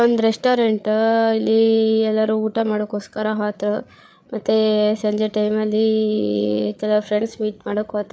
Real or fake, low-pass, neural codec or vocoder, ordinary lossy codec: fake; none; codec, 16 kHz, 8 kbps, FreqCodec, larger model; none